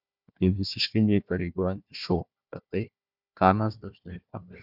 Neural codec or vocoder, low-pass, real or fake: codec, 16 kHz, 1 kbps, FunCodec, trained on Chinese and English, 50 frames a second; 5.4 kHz; fake